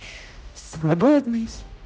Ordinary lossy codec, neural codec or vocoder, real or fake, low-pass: none; codec, 16 kHz, 0.5 kbps, X-Codec, HuBERT features, trained on general audio; fake; none